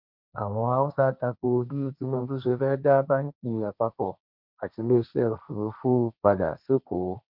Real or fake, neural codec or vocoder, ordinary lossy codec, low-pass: fake; codec, 16 kHz, 1.1 kbps, Voila-Tokenizer; none; 5.4 kHz